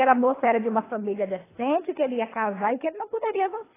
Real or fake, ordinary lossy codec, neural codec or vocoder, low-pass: fake; AAC, 16 kbps; codec, 24 kHz, 3 kbps, HILCodec; 3.6 kHz